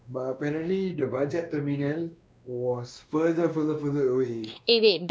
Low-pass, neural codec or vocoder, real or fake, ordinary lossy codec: none; codec, 16 kHz, 2 kbps, X-Codec, WavLM features, trained on Multilingual LibriSpeech; fake; none